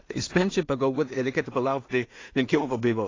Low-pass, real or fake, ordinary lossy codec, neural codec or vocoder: 7.2 kHz; fake; AAC, 32 kbps; codec, 16 kHz in and 24 kHz out, 0.4 kbps, LongCat-Audio-Codec, two codebook decoder